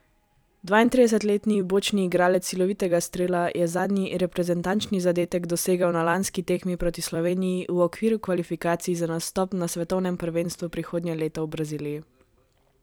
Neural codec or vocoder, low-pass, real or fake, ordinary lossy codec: vocoder, 44.1 kHz, 128 mel bands every 256 samples, BigVGAN v2; none; fake; none